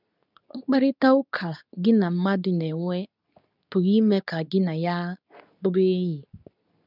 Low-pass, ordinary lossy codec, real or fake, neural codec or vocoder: 5.4 kHz; none; fake; codec, 24 kHz, 0.9 kbps, WavTokenizer, medium speech release version 2